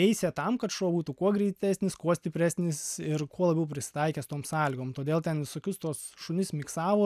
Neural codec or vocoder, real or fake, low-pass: none; real; 14.4 kHz